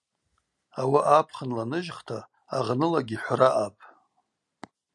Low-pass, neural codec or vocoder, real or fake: 10.8 kHz; none; real